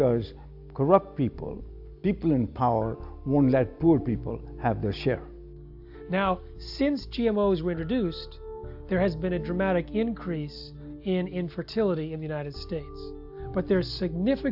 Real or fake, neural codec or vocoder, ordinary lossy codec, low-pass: real; none; AAC, 48 kbps; 5.4 kHz